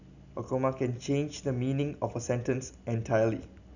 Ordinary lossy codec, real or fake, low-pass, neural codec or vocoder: none; real; 7.2 kHz; none